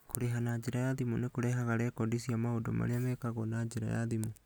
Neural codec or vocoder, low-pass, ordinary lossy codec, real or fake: none; none; none; real